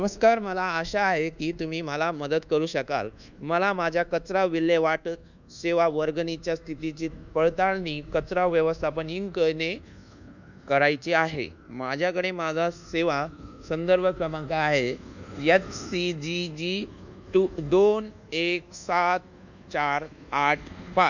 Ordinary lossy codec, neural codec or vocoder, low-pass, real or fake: none; codec, 24 kHz, 1.2 kbps, DualCodec; 7.2 kHz; fake